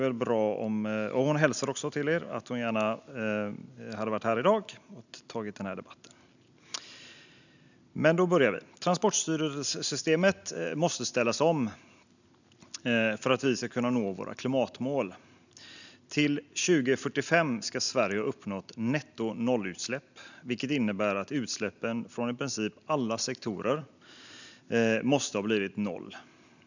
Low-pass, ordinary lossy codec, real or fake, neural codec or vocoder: 7.2 kHz; none; real; none